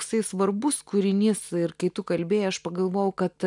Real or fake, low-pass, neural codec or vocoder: real; 10.8 kHz; none